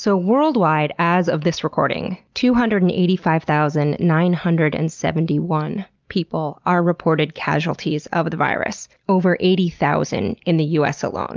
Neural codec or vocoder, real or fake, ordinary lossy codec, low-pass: none; real; Opus, 24 kbps; 7.2 kHz